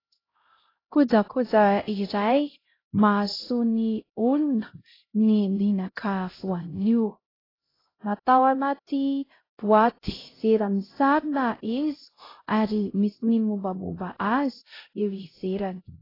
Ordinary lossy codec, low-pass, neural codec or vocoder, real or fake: AAC, 24 kbps; 5.4 kHz; codec, 16 kHz, 0.5 kbps, X-Codec, HuBERT features, trained on LibriSpeech; fake